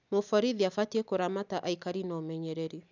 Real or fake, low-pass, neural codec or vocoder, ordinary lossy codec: real; 7.2 kHz; none; none